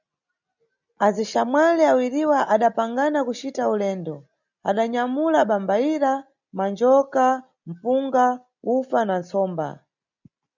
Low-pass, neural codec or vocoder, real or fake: 7.2 kHz; none; real